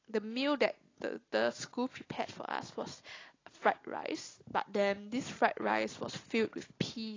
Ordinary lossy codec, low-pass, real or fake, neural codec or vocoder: AAC, 32 kbps; 7.2 kHz; real; none